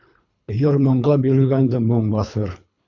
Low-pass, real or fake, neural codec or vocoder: 7.2 kHz; fake; codec, 24 kHz, 3 kbps, HILCodec